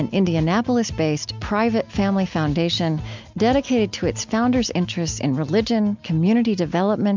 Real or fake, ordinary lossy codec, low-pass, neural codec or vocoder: real; MP3, 64 kbps; 7.2 kHz; none